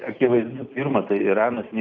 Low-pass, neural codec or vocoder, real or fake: 7.2 kHz; none; real